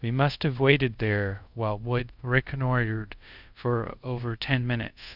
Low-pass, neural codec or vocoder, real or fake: 5.4 kHz; codec, 24 kHz, 0.5 kbps, DualCodec; fake